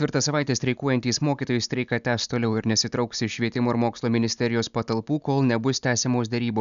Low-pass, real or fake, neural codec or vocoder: 7.2 kHz; real; none